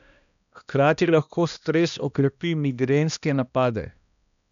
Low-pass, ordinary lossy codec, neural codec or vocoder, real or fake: 7.2 kHz; none; codec, 16 kHz, 1 kbps, X-Codec, HuBERT features, trained on balanced general audio; fake